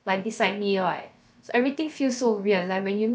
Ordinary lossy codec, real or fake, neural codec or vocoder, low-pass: none; fake; codec, 16 kHz, about 1 kbps, DyCAST, with the encoder's durations; none